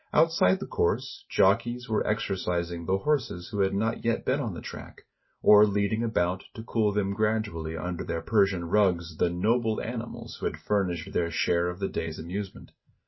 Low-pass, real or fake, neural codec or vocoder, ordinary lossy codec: 7.2 kHz; real; none; MP3, 24 kbps